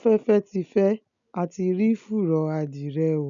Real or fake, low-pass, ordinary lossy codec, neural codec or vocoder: real; 7.2 kHz; none; none